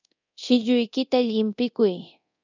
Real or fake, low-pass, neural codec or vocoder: fake; 7.2 kHz; codec, 24 kHz, 0.9 kbps, DualCodec